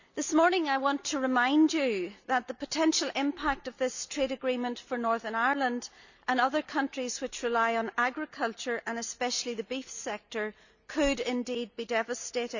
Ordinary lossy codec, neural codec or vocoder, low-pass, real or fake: none; none; 7.2 kHz; real